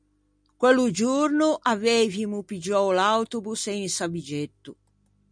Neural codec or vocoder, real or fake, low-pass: none; real; 9.9 kHz